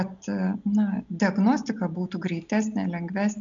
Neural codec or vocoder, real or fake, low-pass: none; real; 7.2 kHz